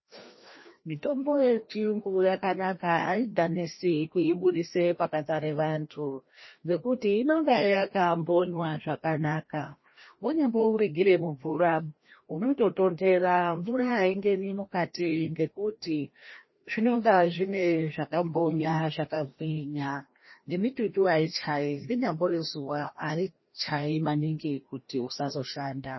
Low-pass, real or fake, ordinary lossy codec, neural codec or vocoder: 7.2 kHz; fake; MP3, 24 kbps; codec, 16 kHz, 1 kbps, FreqCodec, larger model